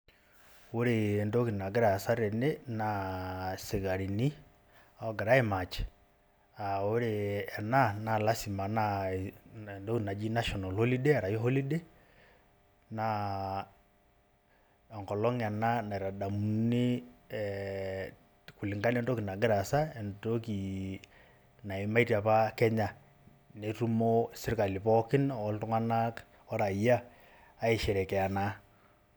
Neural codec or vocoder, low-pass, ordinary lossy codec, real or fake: none; none; none; real